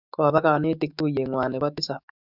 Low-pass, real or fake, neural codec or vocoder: 5.4 kHz; fake; autoencoder, 48 kHz, 128 numbers a frame, DAC-VAE, trained on Japanese speech